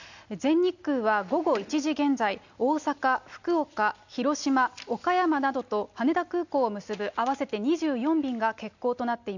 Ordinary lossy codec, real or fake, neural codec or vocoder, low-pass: none; real; none; 7.2 kHz